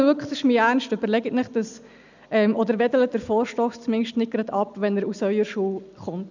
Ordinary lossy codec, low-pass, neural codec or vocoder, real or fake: none; 7.2 kHz; none; real